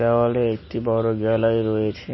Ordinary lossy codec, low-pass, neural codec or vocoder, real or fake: MP3, 24 kbps; 7.2 kHz; none; real